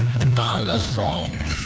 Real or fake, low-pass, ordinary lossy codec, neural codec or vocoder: fake; none; none; codec, 16 kHz, 1 kbps, FunCodec, trained on LibriTTS, 50 frames a second